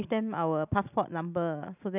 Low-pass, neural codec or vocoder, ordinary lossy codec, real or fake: 3.6 kHz; none; none; real